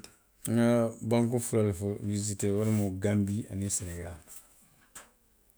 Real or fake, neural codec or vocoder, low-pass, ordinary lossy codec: fake; autoencoder, 48 kHz, 128 numbers a frame, DAC-VAE, trained on Japanese speech; none; none